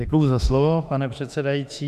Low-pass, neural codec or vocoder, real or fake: 14.4 kHz; autoencoder, 48 kHz, 32 numbers a frame, DAC-VAE, trained on Japanese speech; fake